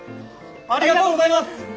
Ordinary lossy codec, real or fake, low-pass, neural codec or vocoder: none; real; none; none